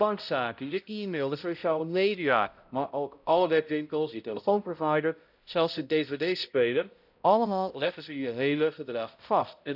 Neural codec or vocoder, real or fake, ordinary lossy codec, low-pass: codec, 16 kHz, 0.5 kbps, X-Codec, HuBERT features, trained on balanced general audio; fake; none; 5.4 kHz